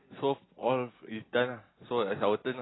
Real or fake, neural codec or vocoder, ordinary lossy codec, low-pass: real; none; AAC, 16 kbps; 7.2 kHz